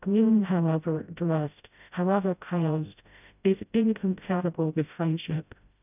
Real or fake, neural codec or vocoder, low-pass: fake; codec, 16 kHz, 0.5 kbps, FreqCodec, smaller model; 3.6 kHz